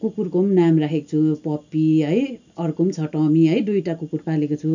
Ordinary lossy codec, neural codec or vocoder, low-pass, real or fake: none; none; 7.2 kHz; real